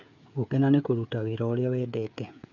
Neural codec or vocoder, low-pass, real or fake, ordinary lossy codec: codec, 16 kHz, 16 kbps, FreqCodec, smaller model; 7.2 kHz; fake; none